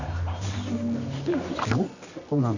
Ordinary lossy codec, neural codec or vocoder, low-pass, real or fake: none; codec, 24 kHz, 0.9 kbps, WavTokenizer, medium music audio release; 7.2 kHz; fake